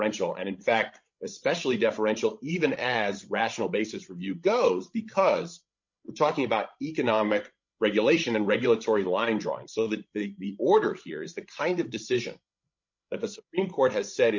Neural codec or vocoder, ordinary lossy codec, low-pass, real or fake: codec, 16 kHz, 16 kbps, FreqCodec, larger model; MP3, 48 kbps; 7.2 kHz; fake